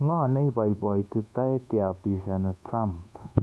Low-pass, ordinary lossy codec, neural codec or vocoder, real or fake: none; none; codec, 24 kHz, 1.2 kbps, DualCodec; fake